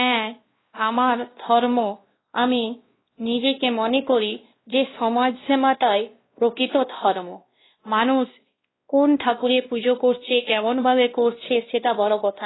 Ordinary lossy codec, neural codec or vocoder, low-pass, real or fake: AAC, 16 kbps; codec, 16 kHz, 1 kbps, X-Codec, WavLM features, trained on Multilingual LibriSpeech; 7.2 kHz; fake